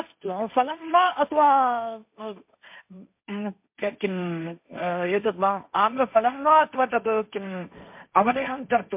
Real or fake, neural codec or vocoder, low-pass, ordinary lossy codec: fake; codec, 16 kHz, 1.1 kbps, Voila-Tokenizer; 3.6 kHz; MP3, 32 kbps